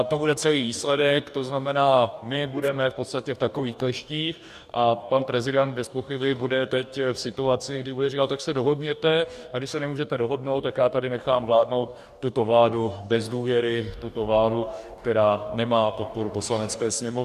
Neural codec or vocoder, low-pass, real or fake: codec, 44.1 kHz, 2.6 kbps, DAC; 14.4 kHz; fake